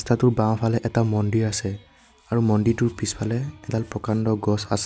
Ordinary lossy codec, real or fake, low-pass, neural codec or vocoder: none; real; none; none